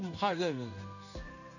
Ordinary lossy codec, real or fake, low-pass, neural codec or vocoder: none; fake; 7.2 kHz; codec, 16 kHz in and 24 kHz out, 1 kbps, XY-Tokenizer